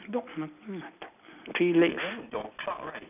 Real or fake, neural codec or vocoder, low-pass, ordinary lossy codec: real; none; 3.6 kHz; none